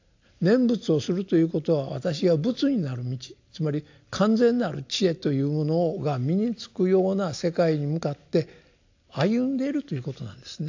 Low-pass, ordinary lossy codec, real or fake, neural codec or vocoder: 7.2 kHz; AAC, 48 kbps; real; none